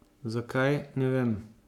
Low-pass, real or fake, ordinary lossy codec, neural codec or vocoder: 19.8 kHz; fake; none; codec, 44.1 kHz, 7.8 kbps, Pupu-Codec